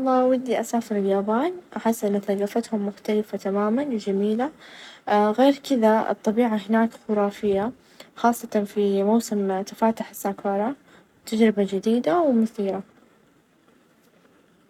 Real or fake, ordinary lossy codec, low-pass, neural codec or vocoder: fake; none; 19.8 kHz; codec, 44.1 kHz, 7.8 kbps, Pupu-Codec